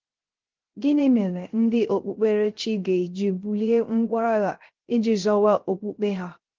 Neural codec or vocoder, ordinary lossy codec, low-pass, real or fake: codec, 16 kHz, 0.3 kbps, FocalCodec; Opus, 16 kbps; 7.2 kHz; fake